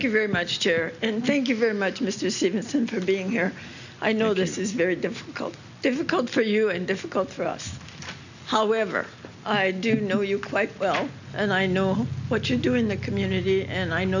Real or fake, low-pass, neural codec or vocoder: real; 7.2 kHz; none